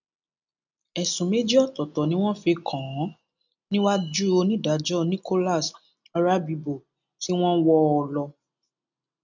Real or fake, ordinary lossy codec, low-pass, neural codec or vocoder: real; none; 7.2 kHz; none